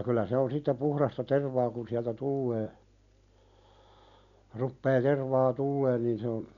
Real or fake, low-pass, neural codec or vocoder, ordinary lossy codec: real; 7.2 kHz; none; none